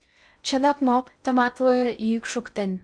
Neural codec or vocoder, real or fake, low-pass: codec, 16 kHz in and 24 kHz out, 0.6 kbps, FocalCodec, streaming, 2048 codes; fake; 9.9 kHz